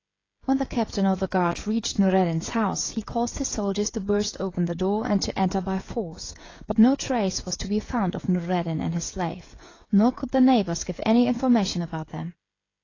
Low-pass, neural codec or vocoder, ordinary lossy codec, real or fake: 7.2 kHz; codec, 16 kHz, 16 kbps, FreqCodec, smaller model; AAC, 32 kbps; fake